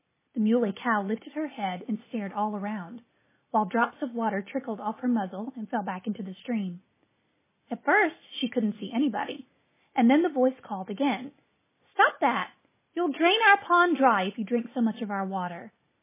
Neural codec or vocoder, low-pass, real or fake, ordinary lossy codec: none; 3.6 kHz; real; MP3, 16 kbps